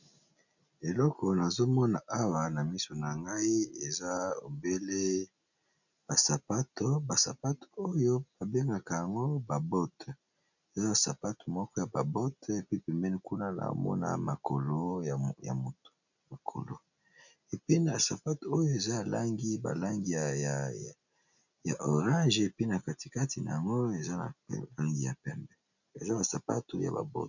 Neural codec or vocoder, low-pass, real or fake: none; 7.2 kHz; real